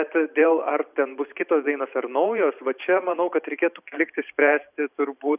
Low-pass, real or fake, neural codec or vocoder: 3.6 kHz; fake; vocoder, 44.1 kHz, 128 mel bands every 512 samples, BigVGAN v2